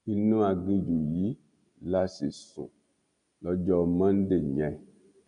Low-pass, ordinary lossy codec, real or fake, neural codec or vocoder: 9.9 kHz; none; real; none